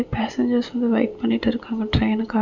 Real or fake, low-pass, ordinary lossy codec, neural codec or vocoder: real; 7.2 kHz; none; none